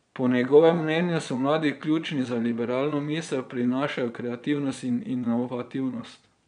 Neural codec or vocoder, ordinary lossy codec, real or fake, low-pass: vocoder, 22.05 kHz, 80 mel bands, Vocos; MP3, 96 kbps; fake; 9.9 kHz